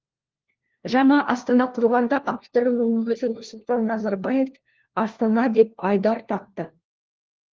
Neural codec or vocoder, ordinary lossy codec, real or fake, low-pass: codec, 16 kHz, 1 kbps, FunCodec, trained on LibriTTS, 50 frames a second; Opus, 16 kbps; fake; 7.2 kHz